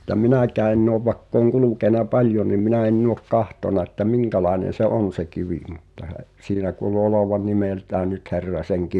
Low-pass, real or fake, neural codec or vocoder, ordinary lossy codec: none; real; none; none